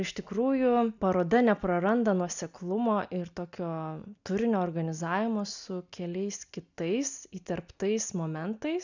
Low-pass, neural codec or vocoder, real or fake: 7.2 kHz; none; real